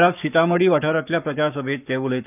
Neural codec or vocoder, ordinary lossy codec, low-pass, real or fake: codec, 44.1 kHz, 7.8 kbps, Pupu-Codec; none; 3.6 kHz; fake